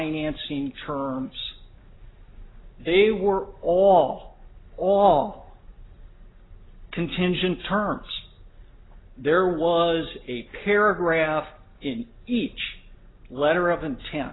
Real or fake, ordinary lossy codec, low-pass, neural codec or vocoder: real; AAC, 16 kbps; 7.2 kHz; none